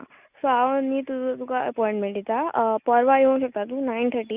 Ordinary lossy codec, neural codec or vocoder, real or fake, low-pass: Opus, 64 kbps; none; real; 3.6 kHz